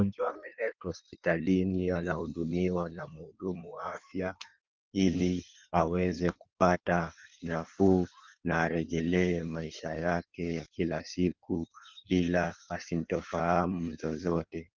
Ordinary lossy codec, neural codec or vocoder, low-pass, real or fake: Opus, 24 kbps; codec, 16 kHz in and 24 kHz out, 1.1 kbps, FireRedTTS-2 codec; 7.2 kHz; fake